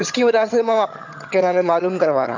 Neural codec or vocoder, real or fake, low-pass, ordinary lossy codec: vocoder, 22.05 kHz, 80 mel bands, HiFi-GAN; fake; 7.2 kHz; MP3, 64 kbps